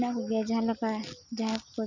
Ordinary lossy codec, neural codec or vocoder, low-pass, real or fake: none; none; 7.2 kHz; real